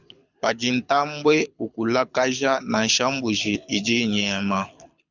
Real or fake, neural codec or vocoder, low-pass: fake; codec, 24 kHz, 6 kbps, HILCodec; 7.2 kHz